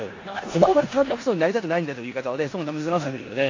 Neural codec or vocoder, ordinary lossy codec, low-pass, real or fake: codec, 16 kHz in and 24 kHz out, 0.9 kbps, LongCat-Audio-Codec, four codebook decoder; AAC, 32 kbps; 7.2 kHz; fake